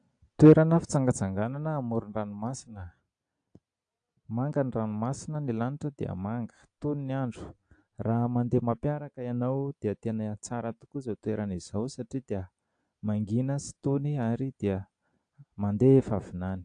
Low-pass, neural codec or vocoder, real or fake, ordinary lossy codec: 9.9 kHz; none; real; AAC, 64 kbps